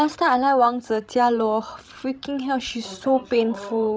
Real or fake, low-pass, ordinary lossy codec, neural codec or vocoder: fake; none; none; codec, 16 kHz, 16 kbps, FreqCodec, larger model